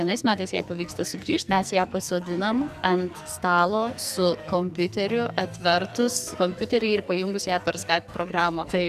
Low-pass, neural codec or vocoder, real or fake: 14.4 kHz; codec, 44.1 kHz, 2.6 kbps, SNAC; fake